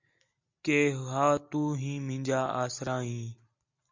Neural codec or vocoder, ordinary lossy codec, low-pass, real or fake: none; MP3, 64 kbps; 7.2 kHz; real